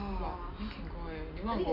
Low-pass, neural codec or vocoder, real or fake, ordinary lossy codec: 5.4 kHz; none; real; MP3, 48 kbps